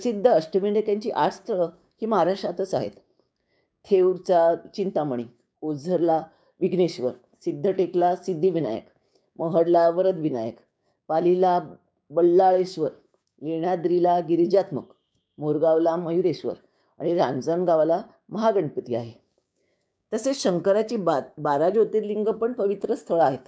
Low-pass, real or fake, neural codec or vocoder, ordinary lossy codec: none; fake; codec, 16 kHz, 6 kbps, DAC; none